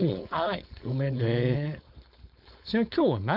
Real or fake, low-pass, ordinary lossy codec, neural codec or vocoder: fake; 5.4 kHz; none; codec, 16 kHz, 4.8 kbps, FACodec